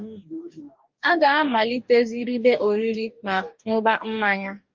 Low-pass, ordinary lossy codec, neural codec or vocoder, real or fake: 7.2 kHz; Opus, 24 kbps; codec, 44.1 kHz, 2.6 kbps, DAC; fake